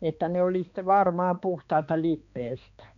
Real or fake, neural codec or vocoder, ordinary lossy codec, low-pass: fake; codec, 16 kHz, 2 kbps, X-Codec, HuBERT features, trained on balanced general audio; none; 7.2 kHz